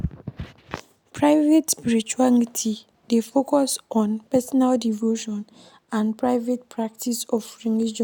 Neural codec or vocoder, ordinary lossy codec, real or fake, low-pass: none; none; real; none